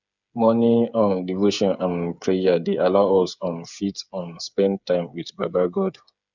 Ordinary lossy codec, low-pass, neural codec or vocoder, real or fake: none; 7.2 kHz; codec, 16 kHz, 8 kbps, FreqCodec, smaller model; fake